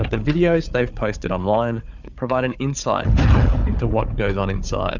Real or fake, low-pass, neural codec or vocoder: fake; 7.2 kHz; codec, 16 kHz, 4 kbps, FunCodec, trained on Chinese and English, 50 frames a second